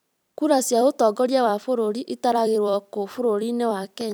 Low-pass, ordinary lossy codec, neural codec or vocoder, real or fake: none; none; vocoder, 44.1 kHz, 128 mel bands every 256 samples, BigVGAN v2; fake